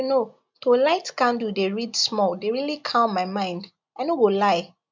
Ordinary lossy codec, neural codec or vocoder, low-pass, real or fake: MP3, 64 kbps; none; 7.2 kHz; real